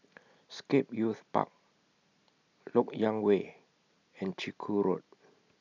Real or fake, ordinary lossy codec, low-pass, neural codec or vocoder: real; none; 7.2 kHz; none